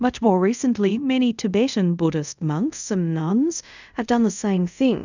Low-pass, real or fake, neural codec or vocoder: 7.2 kHz; fake; codec, 24 kHz, 0.5 kbps, DualCodec